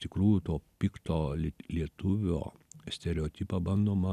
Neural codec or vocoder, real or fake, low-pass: none; real; 14.4 kHz